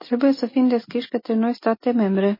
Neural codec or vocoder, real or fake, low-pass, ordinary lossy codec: none; real; 5.4 kHz; MP3, 24 kbps